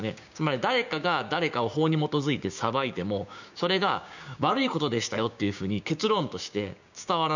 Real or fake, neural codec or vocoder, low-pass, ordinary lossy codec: fake; codec, 16 kHz, 6 kbps, DAC; 7.2 kHz; none